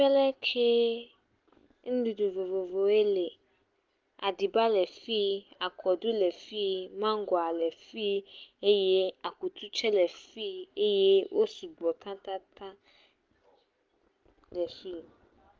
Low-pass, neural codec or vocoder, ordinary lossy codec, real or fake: 7.2 kHz; none; Opus, 32 kbps; real